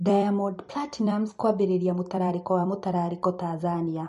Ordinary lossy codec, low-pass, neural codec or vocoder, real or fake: MP3, 48 kbps; 14.4 kHz; vocoder, 44.1 kHz, 128 mel bands every 256 samples, BigVGAN v2; fake